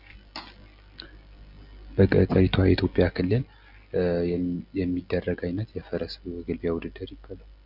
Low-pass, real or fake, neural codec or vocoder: 5.4 kHz; real; none